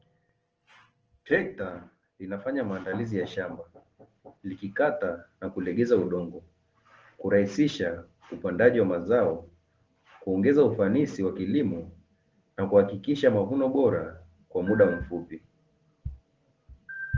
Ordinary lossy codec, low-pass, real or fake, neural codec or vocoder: Opus, 16 kbps; 7.2 kHz; real; none